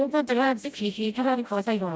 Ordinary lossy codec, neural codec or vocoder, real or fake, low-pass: none; codec, 16 kHz, 0.5 kbps, FreqCodec, smaller model; fake; none